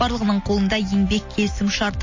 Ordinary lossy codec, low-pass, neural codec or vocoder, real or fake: MP3, 32 kbps; 7.2 kHz; none; real